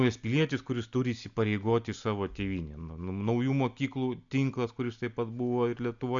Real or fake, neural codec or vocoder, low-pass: real; none; 7.2 kHz